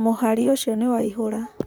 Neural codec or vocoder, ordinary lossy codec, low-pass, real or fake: vocoder, 44.1 kHz, 128 mel bands, Pupu-Vocoder; none; none; fake